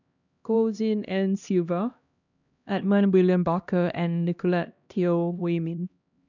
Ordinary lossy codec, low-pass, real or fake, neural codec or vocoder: none; 7.2 kHz; fake; codec, 16 kHz, 1 kbps, X-Codec, HuBERT features, trained on LibriSpeech